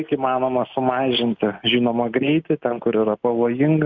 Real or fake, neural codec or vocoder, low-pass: real; none; 7.2 kHz